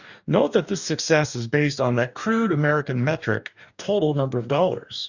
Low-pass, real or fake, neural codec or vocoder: 7.2 kHz; fake; codec, 44.1 kHz, 2.6 kbps, DAC